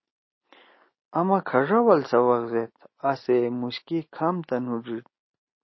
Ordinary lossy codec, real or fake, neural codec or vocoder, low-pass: MP3, 24 kbps; real; none; 7.2 kHz